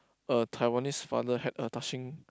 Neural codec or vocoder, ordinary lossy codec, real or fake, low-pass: none; none; real; none